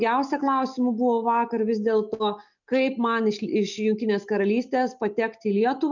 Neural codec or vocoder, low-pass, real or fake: none; 7.2 kHz; real